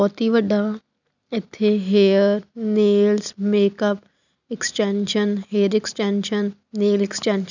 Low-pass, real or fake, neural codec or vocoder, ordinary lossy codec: 7.2 kHz; real; none; none